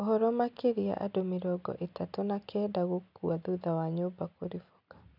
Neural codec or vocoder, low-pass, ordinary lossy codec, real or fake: none; 5.4 kHz; none; real